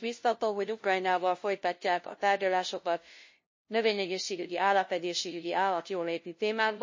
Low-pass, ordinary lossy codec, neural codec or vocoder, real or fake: 7.2 kHz; MP3, 32 kbps; codec, 16 kHz, 0.5 kbps, FunCodec, trained on LibriTTS, 25 frames a second; fake